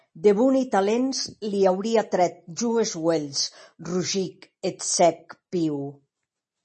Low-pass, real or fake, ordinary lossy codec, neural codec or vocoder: 10.8 kHz; real; MP3, 32 kbps; none